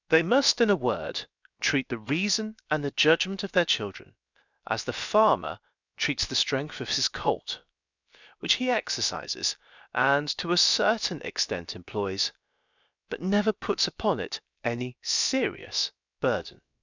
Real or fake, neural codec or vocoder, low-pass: fake; codec, 16 kHz, about 1 kbps, DyCAST, with the encoder's durations; 7.2 kHz